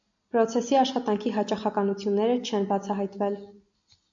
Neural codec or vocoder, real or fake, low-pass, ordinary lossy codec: none; real; 7.2 kHz; AAC, 64 kbps